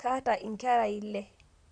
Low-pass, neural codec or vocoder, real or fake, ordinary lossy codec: 9.9 kHz; vocoder, 44.1 kHz, 128 mel bands, Pupu-Vocoder; fake; AAC, 64 kbps